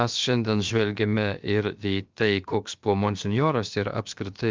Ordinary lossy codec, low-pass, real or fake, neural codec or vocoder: Opus, 32 kbps; 7.2 kHz; fake; codec, 16 kHz, about 1 kbps, DyCAST, with the encoder's durations